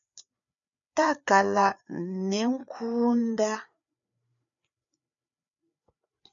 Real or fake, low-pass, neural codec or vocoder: fake; 7.2 kHz; codec, 16 kHz, 4 kbps, FreqCodec, larger model